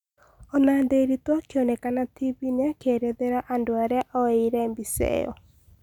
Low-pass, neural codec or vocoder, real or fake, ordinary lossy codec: 19.8 kHz; none; real; none